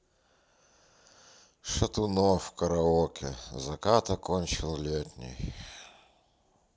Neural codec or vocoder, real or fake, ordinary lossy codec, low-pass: none; real; none; none